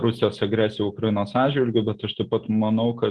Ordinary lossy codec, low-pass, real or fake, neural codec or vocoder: Opus, 16 kbps; 10.8 kHz; real; none